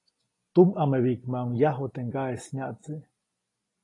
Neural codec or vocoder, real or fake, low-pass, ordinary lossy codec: none; real; 10.8 kHz; AAC, 32 kbps